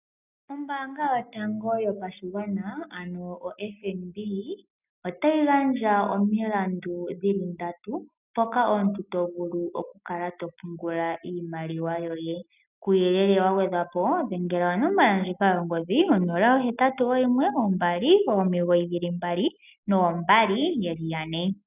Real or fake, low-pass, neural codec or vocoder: real; 3.6 kHz; none